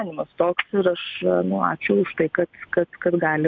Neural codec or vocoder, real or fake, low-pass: none; real; 7.2 kHz